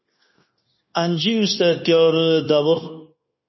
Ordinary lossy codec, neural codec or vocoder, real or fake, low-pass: MP3, 24 kbps; codec, 16 kHz, 0.9 kbps, LongCat-Audio-Codec; fake; 7.2 kHz